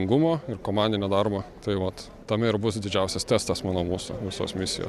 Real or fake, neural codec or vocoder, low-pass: real; none; 14.4 kHz